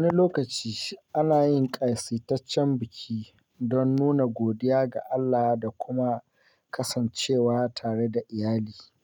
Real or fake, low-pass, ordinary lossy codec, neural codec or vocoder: real; 19.8 kHz; none; none